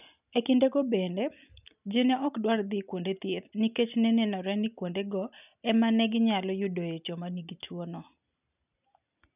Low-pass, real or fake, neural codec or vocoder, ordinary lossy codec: 3.6 kHz; real; none; none